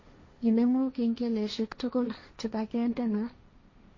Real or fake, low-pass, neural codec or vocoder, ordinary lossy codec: fake; 7.2 kHz; codec, 16 kHz, 1.1 kbps, Voila-Tokenizer; MP3, 32 kbps